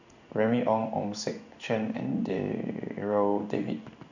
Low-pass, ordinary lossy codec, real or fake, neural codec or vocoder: 7.2 kHz; none; fake; codec, 16 kHz in and 24 kHz out, 1 kbps, XY-Tokenizer